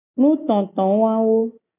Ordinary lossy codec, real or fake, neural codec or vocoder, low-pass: AAC, 16 kbps; real; none; 3.6 kHz